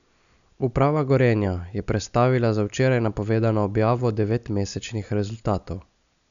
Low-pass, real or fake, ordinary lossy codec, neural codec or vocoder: 7.2 kHz; real; none; none